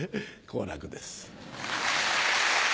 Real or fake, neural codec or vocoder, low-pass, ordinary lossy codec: real; none; none; none